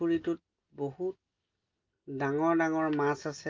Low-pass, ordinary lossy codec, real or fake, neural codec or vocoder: 7.2 kHz; Opus, 32 kbps; real; none